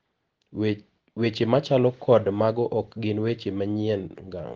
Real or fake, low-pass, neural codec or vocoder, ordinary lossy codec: real; 7.2 kHz; none; Opus, 16 kbps